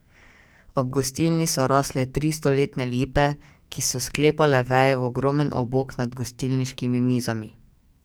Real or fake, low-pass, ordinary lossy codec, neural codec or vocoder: fake; none; none; codec, 44.1 kHz, 2.6 kbps, SNAC